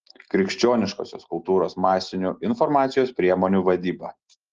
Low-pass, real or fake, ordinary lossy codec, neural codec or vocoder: 7.2 kHz; real; Opus, 16 kbps; none